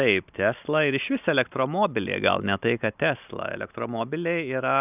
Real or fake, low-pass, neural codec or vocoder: real; 3.6 kHz; none